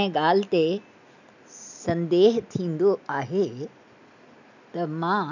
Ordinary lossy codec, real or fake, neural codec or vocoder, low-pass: none; real; none; 7.2 kHz